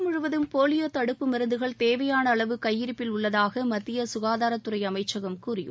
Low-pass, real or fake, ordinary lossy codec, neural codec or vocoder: none; real; none; none